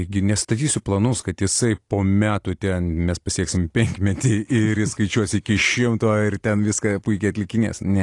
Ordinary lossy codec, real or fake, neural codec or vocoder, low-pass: AAC, 48 kbps; real; none; 10.8 kHz